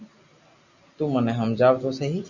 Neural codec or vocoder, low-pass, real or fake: none; 7.2 kHz; real